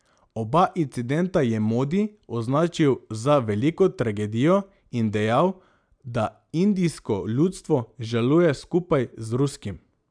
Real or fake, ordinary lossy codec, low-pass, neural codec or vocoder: real; MP3, 96 kbps; 9.9 kHz; none